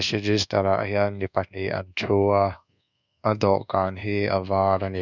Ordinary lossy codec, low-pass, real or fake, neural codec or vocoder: none; 7.2 kHz; fake; codec, 24 kHz, 1.2 kbps, DualCodec